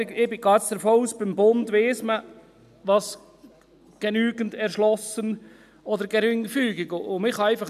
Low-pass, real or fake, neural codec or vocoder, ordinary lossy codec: 14.4 kHz; real; none; none